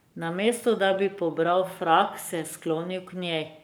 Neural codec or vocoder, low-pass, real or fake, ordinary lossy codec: codec, 44.1 kHz, 7.8 kbps, Pupu-Codec; none; fake; none